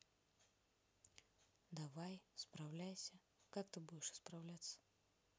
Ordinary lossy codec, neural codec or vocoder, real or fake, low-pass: none; none; real; none